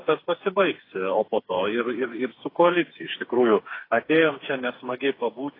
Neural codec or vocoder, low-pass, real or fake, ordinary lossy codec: codec, 16 kHz, 4 kbps, FreqCodec, smaller model; 5.4 kHz; fake; AAC, 24 kbps